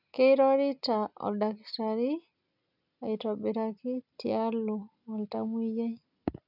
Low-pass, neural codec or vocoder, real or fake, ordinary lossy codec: 5.4 kHz; none; real; none